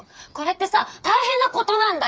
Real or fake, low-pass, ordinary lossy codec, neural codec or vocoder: fake; none; none; codec, 16 kHz, 4 kbps, FreqCodec, smaller model